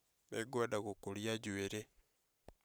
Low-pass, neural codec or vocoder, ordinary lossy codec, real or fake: none; vocoder, 44.1 kHz, 128 mel bands every 512 samples, BigVGAN v2; none; fake